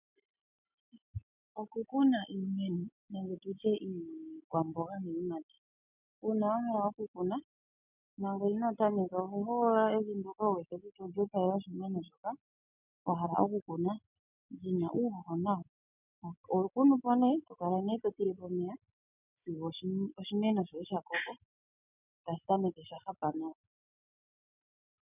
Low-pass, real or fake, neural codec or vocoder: 3.6 kHz; real; none